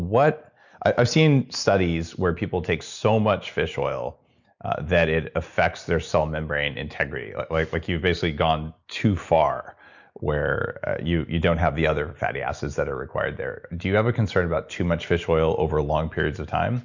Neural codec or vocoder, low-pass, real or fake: none; 7.2 kHz; real